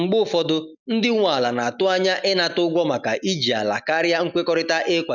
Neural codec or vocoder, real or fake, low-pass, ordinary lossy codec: none; real; 7.2 kHz; none